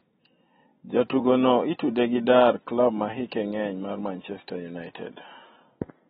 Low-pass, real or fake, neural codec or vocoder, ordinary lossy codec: 19.8 kHz; real; none; AAC, 16 kbps